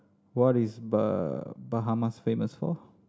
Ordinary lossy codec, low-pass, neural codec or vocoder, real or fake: none; none; none; real